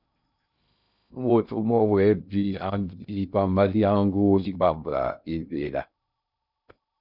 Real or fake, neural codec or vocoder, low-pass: fake; codec, 16 kHz in and 24 kHz out, 0.6 kbps, FocalCodec, streaming, 2048 codes; 5.4 kHz